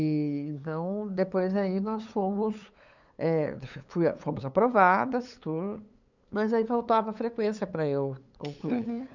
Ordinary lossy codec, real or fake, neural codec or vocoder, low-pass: none; fake; codec, 16 kHz, 8 kbps, FunCodec, trained on LibriTTS, 25 frames a second; 7.2 kHz